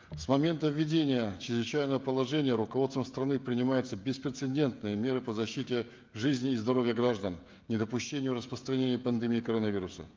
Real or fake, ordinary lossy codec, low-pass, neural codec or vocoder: fake; Opus, 24 kbps; 7.2 kHz; codec, 16 kHz, 16 kbps, FreqCodec, smaller model